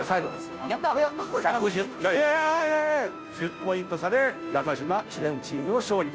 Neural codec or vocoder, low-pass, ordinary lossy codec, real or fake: codec, 16 kHz, 0.5 kbps, FunCodec, trained on Chinese and English, 25 frames a second; none; none; fake